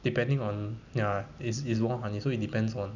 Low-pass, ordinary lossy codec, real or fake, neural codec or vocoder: 7.2 kHz; none; real; none